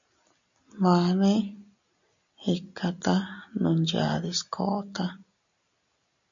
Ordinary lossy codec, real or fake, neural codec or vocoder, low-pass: MP3, 96 kbps; real; none; 7.2 kHz